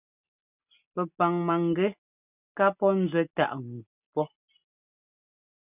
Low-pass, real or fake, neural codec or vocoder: 3.6 kHz; real; none